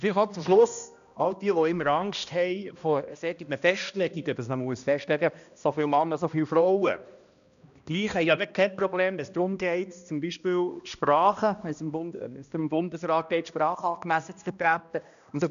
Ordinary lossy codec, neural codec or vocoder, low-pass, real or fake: none; codec, 16 kHz, 1 kbps, X-Codec, HuBERT features, trained on balanced general audio; 7.2 kHz; fake